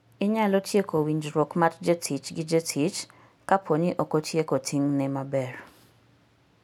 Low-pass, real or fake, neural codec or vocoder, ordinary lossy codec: 19.8 kHz; real; none; none